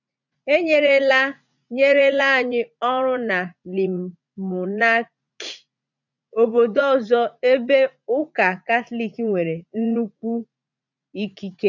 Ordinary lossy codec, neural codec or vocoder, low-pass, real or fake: none; vocoder, 44.1 kHz, 80 mel bands, Vocos; 7.2 kHz; fake